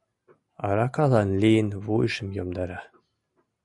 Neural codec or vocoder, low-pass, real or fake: none; 10.8 kHz; real